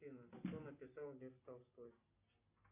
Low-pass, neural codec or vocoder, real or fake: 3.6 kHz; none; real